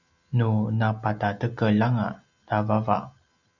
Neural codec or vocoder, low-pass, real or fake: none; 7.2 kHz; real